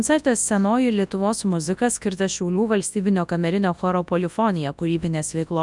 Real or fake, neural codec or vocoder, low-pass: fake; codec, 24 kHz, 0.9 kbps, WavTokenizer, large speech release; 10.8 kHz